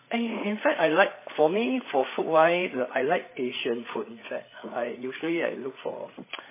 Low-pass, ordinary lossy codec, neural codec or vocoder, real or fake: 3.6 kHz; MP3, 16 kbps; codec, 16 kHz, 8 kbps, FreqCodec, larger model; fake